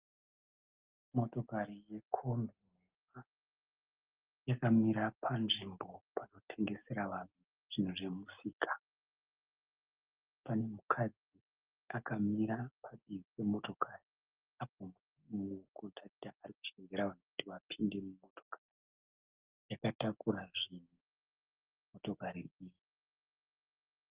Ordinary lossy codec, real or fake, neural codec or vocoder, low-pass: Opus, 32 kbps; real; none; 3.6 kHz